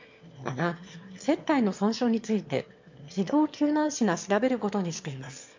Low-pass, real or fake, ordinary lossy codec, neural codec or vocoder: 7.2 kHz; fake; MP3, 48 kbps; autoencoder, 22.05 kHz, a latent of 192 numbers a frame, VITS, trained on one speaker